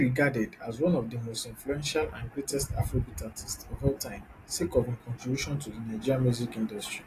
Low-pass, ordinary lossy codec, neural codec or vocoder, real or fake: 14.4 kHz; AAC, 48 kbps; none; real